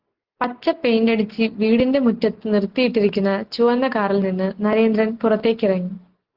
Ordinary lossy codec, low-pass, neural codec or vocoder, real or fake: Opus, 16 kbps; 5.4 kHz; none; real